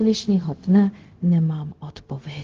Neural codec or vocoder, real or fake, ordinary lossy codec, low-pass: codec, 16 kHz, 0.4 kbps, LongCat-Audio-Codec; fake; Opus, 16 kbps; 7.2 kHz